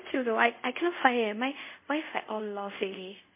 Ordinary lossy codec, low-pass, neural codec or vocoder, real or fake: MP3, 32 kbps; 3.6 kHz; codec, 24 kHz, 0.5 kbps, DualCodec; fake